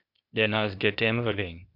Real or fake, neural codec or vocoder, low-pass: fake; codec, 16 kHz, 0.8 kbps, ZipCodec; 5.4 kHz